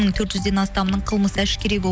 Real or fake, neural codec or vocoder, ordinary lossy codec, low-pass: real; none; none; none